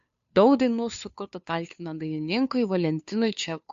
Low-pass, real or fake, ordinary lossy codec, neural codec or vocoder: 7.2 kHz; fake; AAC, 64 kbps; codec, 16 kHz, 2 kbps, FunCodec, trained on Chinese and English, 25 frames a second